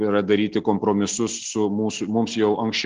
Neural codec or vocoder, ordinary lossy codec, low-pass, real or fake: none; Opus, 16 kbps; 7.2 kHz; real